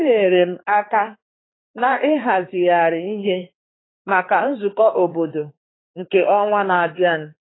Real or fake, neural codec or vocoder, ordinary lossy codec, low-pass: fake; codec, 16 kHz, 2 kbps, X-Codec, HuBERT features, trained on balanced general audio; AAC, 16 kbps; 7.2 kHz